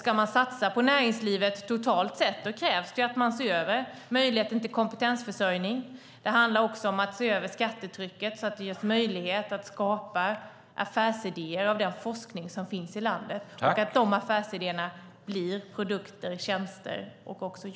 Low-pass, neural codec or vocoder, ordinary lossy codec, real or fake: none; none; none; real